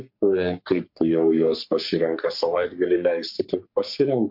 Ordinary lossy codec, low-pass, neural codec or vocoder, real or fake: MP3, 48 kbps; 5.4 kHz; codec, 44.1 kHz, 3.4 kbps, Pupu-Codec; fake